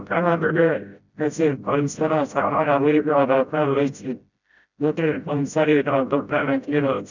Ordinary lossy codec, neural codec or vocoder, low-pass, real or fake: none; codec, 16 kHz, 0.5 kbps, FreqCodec, smaller model; 7.2 kHz; fake